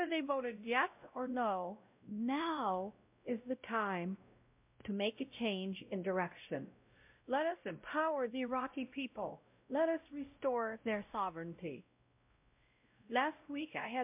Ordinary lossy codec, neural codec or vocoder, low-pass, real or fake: MP3, 32 kbps; codec, 16 kHz, 0.5 kbps, X-Codec, WavLM features, trained on Multilingual LibriSpeech; 3.6 kHz; fake